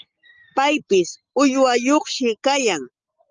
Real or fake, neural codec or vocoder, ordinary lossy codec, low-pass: real; none; Opus, 24 kbps; 7.2 kHz